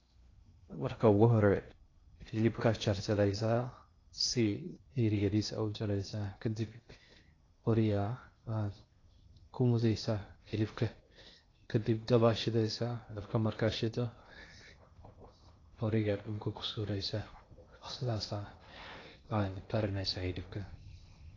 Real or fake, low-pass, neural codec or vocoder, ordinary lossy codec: fake; 7.2 kHz; codec, 16 kHz in and 24 kHz out, 0.6 kbps, FocalCodec, streaming, 2048 codes; AAC, 32 kbps